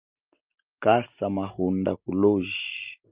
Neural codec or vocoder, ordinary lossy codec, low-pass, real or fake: none; Opus, 24 kbps; 3.6 kHz; real